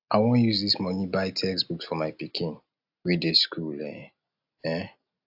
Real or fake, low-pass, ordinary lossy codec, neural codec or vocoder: real; 5.4 kHz; AAC, 48 kbps; none